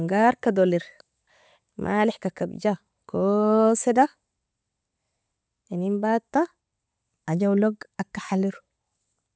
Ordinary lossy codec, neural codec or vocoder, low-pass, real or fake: none; none; none; real